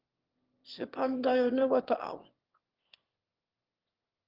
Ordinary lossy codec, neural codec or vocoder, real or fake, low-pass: Opus, 16 kbps; autoencoder, 22.05 kHz, a latent of 192 numbers a frame, VITS, trained on one speaker; fake; 5.4 kHz